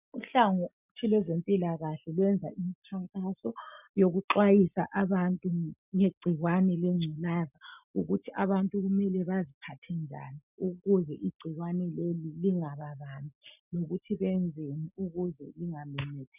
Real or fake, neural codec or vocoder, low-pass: real; none; 3.6 kHz